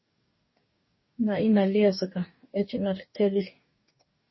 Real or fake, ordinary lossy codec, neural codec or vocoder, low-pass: fake; MP3, 24 kbps; codec, 44.1 kHz, 2.6 kbps, DAC; 7.2 kHz